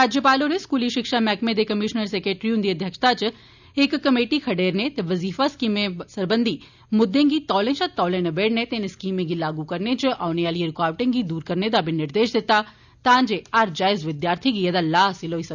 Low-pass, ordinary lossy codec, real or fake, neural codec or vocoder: 7.2 kHz; none; real; none